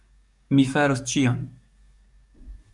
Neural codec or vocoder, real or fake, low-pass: codec, 44.1 kHz, 7.8 kbps, DAC; fake; 10.8 kHz